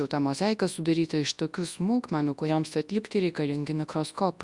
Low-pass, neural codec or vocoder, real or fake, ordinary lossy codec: 10.8 kHz; codec, 24 kHz, 0.9 kbps, WavTokenizer, large speech release; fake; Opus, 64 kbps